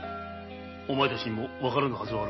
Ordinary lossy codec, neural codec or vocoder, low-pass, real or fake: MP3, 24 kbps; none; 7.2 kHz; real